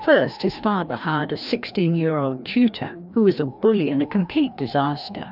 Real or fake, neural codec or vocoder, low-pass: fake; codec, 16 kHz, 1 kbps, FreqCodec, larger model; 5.4 kHz